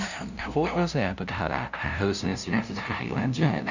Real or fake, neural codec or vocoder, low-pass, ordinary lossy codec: fake; codec, 16 kHz, 0.5 kbps, FunCodec, trained on LibriTTS, 25 frames a second; 7.2 kHz; none